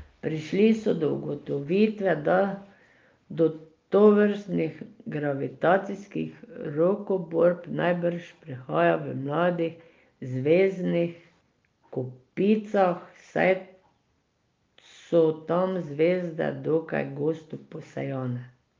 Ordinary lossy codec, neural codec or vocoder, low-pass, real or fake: Opus, 24 kbps; none; 7.2 kHz; real